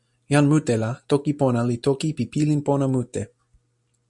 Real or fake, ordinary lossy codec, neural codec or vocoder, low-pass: real; MP3, 96 kbps; none; 10.8 kHz